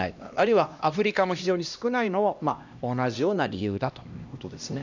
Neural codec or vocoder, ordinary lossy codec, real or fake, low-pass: codec, 16 kHz, 1 kbps, X-Codec, HuBERT features, trained on LibriSpeech; none; fake; 7.2 kHz